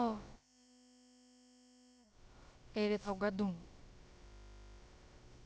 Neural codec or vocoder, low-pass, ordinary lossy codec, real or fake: codec, 16 kHz, about 1 kbps, DyCAST, with the encoder's durations; none; none; fake